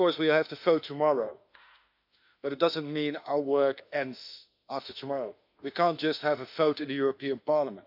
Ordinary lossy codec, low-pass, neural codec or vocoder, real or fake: none; 5.4 kHz; autoencoder, 48 kHz, 32 numbers a frame, DAC-VAE, trained on Japanese speech; fake